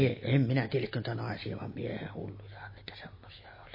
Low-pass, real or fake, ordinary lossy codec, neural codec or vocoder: 5.4 kHz; real; MP3, 24 kbps; none